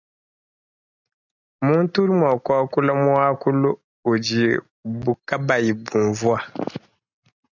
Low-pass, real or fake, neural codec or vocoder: 7.2 kHz; real; none